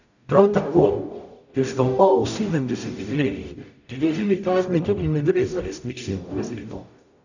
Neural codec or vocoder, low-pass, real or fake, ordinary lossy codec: codec, 44.1 kHz, 0.9 kbps, DAC; 7.2 kHz; fake; none